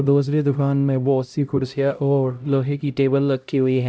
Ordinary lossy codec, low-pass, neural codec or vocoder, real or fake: none; none; codec, 16 kHz, 0.5 kbps, X-Codec, HuBERT features, trained on LibriSpeech; fake